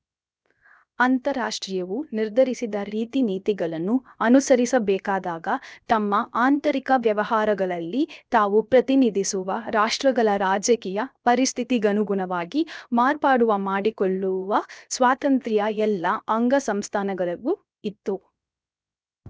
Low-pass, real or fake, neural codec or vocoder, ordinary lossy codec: none; fake; codec, 16 kHz, 0.7 kbps, FocalCodec; none